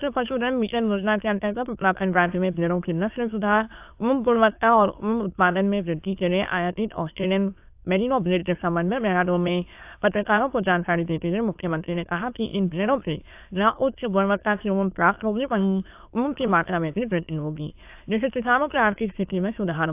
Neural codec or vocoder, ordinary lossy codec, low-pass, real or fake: autoencoder, 22.05 kHz, a latent of 192 numbers a frame, VITS, trained on many speakers; AAC, 32 kbps; 3.6 kHz; fake